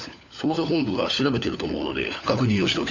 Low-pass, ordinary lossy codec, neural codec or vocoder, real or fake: 7.2 kHz; none; codec, 16 kHz, 4 kbps, FunCodec, trained on LibriTTS, 50 frames a second; fake